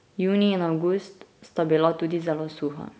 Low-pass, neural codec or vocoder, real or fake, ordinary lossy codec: none; none; real; none